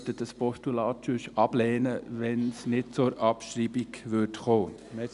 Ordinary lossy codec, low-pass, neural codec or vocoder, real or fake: none; 10.8 kHz; none; real